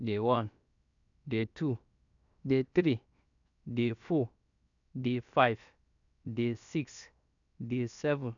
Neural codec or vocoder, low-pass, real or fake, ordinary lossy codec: codec, 16 kHz, 0.7 kbps, FocalCodec; 7.2 kHz; fake; none